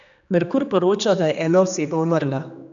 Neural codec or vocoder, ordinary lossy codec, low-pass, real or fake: codec, 16 kHz, 2 kbps, X-Codec, HuBERT features, trained on general audio; none; 7.2 kHz; fake